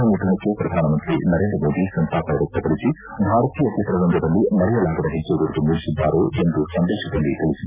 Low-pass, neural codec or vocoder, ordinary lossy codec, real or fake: 3.6 kHz; none; Opus, 64 kbps; real